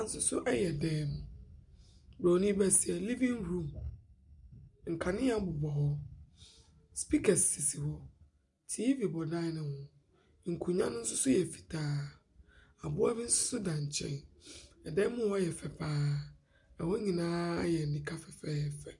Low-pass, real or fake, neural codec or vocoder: 10.8 kHz; real; none